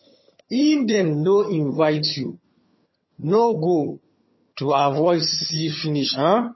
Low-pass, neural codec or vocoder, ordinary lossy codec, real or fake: 7.2 kHz; vocoder, 22.05 kHz, 80 mel bands, HiFi-GAN; MP3, 24 kbps; fake